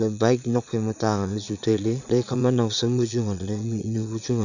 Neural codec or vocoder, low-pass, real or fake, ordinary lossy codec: vocoder, 22.05 kHz, 80 mel bands, Vocos; 7.2 kHz; fake; none